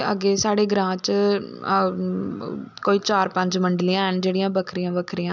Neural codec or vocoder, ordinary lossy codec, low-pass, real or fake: none; none; 7.2 kHz; real